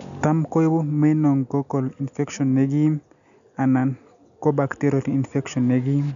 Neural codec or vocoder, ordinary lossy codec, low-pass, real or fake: none; none; 7.2 kHz; real